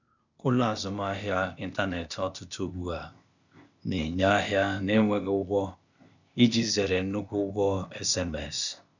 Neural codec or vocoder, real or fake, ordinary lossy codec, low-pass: codec, 16 kHz, 0.8 kbps, ZipCodec; fake; none; 7.2 kHz